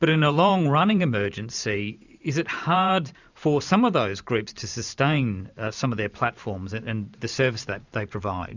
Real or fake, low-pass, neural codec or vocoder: fake; 7.2 kHz; vocoder, 44.1 kHz, 128 mel bands every 512 samples, BigVGAN v2